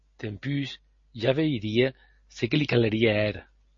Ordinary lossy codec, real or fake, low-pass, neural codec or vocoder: MP3, 32 kbps; real; 7.2 kHz; none